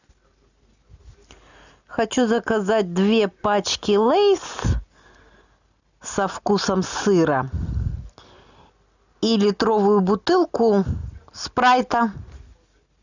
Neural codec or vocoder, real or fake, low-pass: none; real; 7.2 kHz